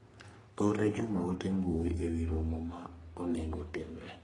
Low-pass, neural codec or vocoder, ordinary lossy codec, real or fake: 10.8 kHz; codec, 44.1 kHz, 3.4 kbps, Pupu-Codec; AAC, 32 kbps; fake